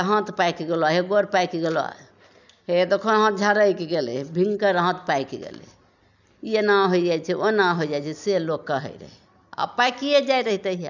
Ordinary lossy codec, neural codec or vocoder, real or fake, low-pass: none; none; real; 7.2 kHz